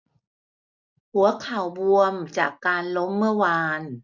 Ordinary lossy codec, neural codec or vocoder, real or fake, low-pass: none; none; real; 7.2 kHz